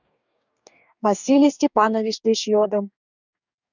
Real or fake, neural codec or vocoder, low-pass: fake; codec, 44.1 kHz, 2.6 kbps, DAC; 7.2 kHz